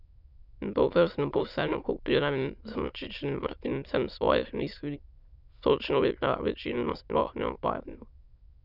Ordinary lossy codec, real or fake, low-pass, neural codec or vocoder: none; fake; 5.4 kHz; autoencoder, 22.05 kHz, a latent of 192 numbers a frame, VITS, trained on many speakers